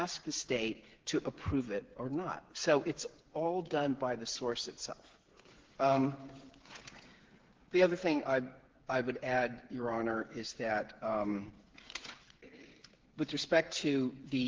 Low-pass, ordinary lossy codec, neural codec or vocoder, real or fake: 7.2 kHz; Opus, 16 kbps; codec, 16 kHz, 8 kbps, FreqCodec, smaller model; fake